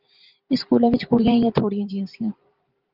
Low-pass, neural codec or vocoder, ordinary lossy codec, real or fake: 5.4 kHz; vocoder, 44.1 kHz, 128 mel bands every 512 samples, BigVGAN v2; Opus, 32 kbps; fake